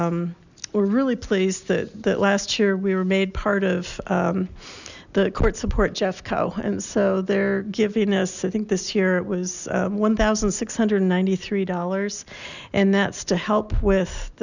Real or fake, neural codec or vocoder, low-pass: real; none; 7.2 kHz